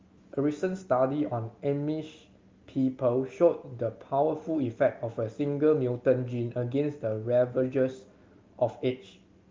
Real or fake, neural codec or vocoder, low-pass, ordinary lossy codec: real; none; 7.2 kHz; Opus, 32 kbps